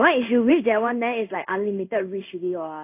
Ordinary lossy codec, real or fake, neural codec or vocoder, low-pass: AAC, 24 kbps; fake; codec, 16 kHz in and 24 kHz out, 1 kbps, XY-Tokenizer; 3.6 kHz